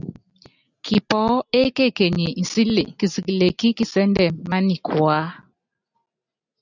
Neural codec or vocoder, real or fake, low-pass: none; real; 7.2 kHz